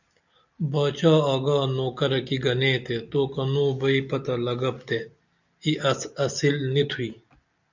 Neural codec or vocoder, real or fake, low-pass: none; real; 7.2 kHz